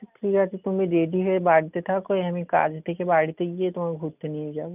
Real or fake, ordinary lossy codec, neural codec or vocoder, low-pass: real; none; none; 3.6 kHz